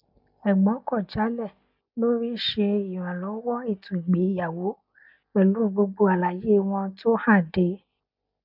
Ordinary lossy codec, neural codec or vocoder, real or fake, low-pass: none; none; real; 5.4 kHz